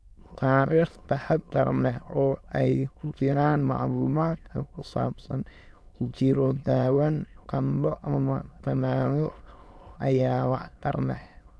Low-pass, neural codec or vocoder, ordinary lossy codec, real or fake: none; autoencoder, 22.05 kHz, a latent of 192 numbers a frame, VITS, trained on many speakers; none; fake